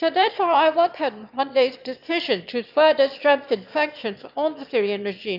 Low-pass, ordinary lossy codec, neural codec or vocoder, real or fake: 5.4 kHz; none; autoencoder, 22.05 kHz, a latent of 192 numbers a frame, VITS, trained on one speaker; fake